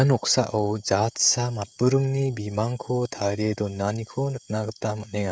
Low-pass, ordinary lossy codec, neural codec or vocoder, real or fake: none; none; codec, 16 kHz, 16 kbps, FreqCodec, smaller model; fake